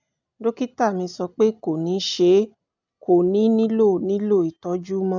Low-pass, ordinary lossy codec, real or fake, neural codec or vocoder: 7.2 kHz; none; real; none